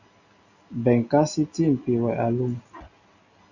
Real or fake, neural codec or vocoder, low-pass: real; none; 7.2 kHz